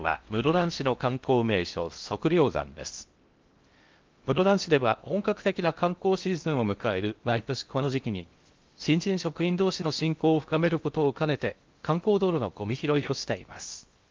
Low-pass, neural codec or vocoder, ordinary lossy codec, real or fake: 7.2 kHz; codec, 16 kHz in and 24 kHz out, 0.6 kbps, FocalCodec, streaming, 4096 codes; Opus, 32 kbps; fake